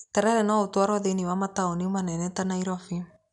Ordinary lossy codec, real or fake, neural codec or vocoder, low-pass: none; real; none; 10.8 kHz